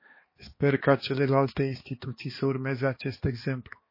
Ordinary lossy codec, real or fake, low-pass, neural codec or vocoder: MP3, 24 kbps; fake; 5.4 kHz; codec, 16 kHz, 2 kbps, X-Codec, HuBERT features, trained on balanced general audio